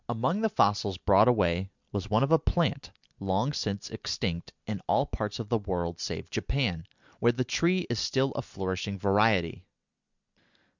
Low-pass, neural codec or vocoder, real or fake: 7.2 kHz; none; real